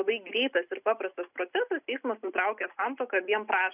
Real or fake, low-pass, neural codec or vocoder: real; 3.6 kHz; none